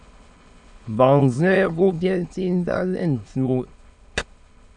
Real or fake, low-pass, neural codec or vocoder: fake; 9.9 kHz; autoencoder, 22.05 kHz, a latent of 192 numbers a frame, VITS, trained on many speakers